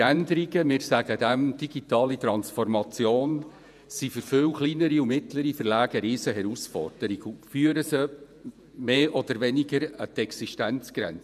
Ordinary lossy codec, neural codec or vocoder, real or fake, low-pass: none; vocoder, 48 kHz, 128 mel bands, Vocos; fake; 14.4 kHz